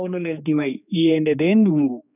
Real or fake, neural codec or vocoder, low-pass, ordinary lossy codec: fake; codec, 16 kHz, 2 kbps, X-Codec, HuBERT features, trained on general audio; 3.6 kHz; none